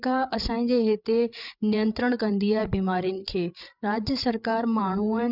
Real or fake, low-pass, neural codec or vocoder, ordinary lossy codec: fake; 5.4 kHz; codec, 16 kHz, 8 kbps, FreqCodec, larger model; none